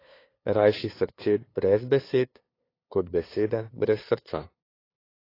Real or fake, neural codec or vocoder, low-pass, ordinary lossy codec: fake; codec, 16 kHz, 2 kbps, FunCodec, trained on LibriTTS, 25 frames a second; 5.4 kHz; AAC, 24 kbps